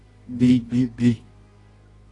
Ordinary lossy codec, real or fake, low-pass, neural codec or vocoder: AAC, 32 kbps; fake; 10.8 kHz; codec, 24 kHz, 0.9 kbps, WavTokenizer, medium music audio release